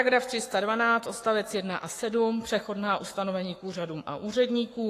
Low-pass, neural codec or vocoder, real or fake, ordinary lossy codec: 14.4 kHz; codec, 44.1 kHz, 7.8 kbps, Pupu-Codec; fake; AAC, 48 kbps